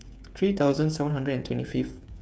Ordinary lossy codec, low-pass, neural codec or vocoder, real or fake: none; none; codec, 16 kHz, 8 kbps, FreqCodec, smaller model; fake